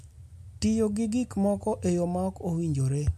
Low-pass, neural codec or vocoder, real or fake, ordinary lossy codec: 14.4 kHz; none; real; MP3, 64 kbps